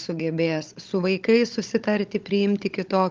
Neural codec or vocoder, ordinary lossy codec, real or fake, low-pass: codec, 16 kHz, 16 kbps, FunCodec, trained on Chinese and English, 50 frames a second; Opus, 32 kbps; fake; 7.2 kHz